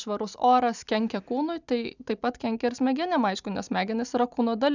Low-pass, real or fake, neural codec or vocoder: 7.2 kHz; real; none